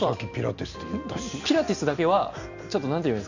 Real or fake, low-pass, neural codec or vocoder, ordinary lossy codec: real; 7.2 kHz; none; none